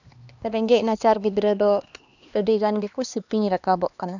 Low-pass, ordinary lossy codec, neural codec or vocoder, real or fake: 7.2 kHz; none; codec, 16 kHz, 2 kbps, X-Codec, HuBERT features, trained on LibriSpeech; fake